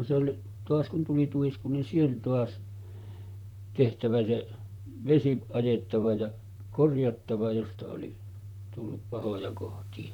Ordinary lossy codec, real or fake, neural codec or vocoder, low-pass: none; fake; vocoder, 44.1 kHz, 128 mel bands, Pupu-Vocoder; 19.8 kHz